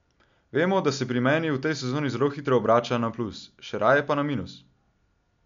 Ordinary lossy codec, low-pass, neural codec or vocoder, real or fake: MP3, 64 kbps; 7.2 kHz; none; real